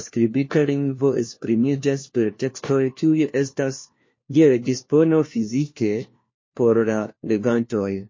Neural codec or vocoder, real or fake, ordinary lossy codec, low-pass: codec, 16 kHz, 1 kbps, FunCodec, trained on LibriTTS, 50 frames a second; fake; MP3, 32 kbps; 7.2 kHz